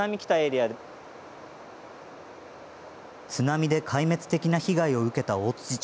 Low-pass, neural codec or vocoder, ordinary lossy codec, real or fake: none; none; none; real